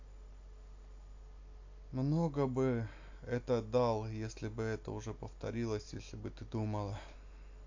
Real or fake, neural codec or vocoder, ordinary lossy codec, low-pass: real; none; AAC, 48 kbps; 7.2 kHz